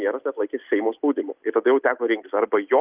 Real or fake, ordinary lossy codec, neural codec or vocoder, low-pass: real; Opus, 24 kbps; none; 3.6 kHz